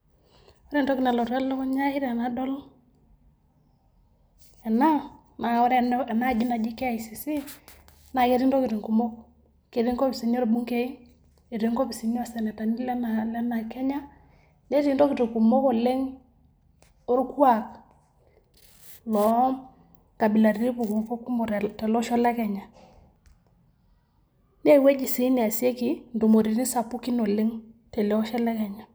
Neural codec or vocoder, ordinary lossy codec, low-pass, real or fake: none; none; none; real